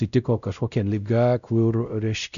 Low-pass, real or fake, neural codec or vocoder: 7.2 kHz; fake; codec, 16 kHz, 0.5 kbps, X-Codec, WavLM features, trained on Multilingual LibriSpeech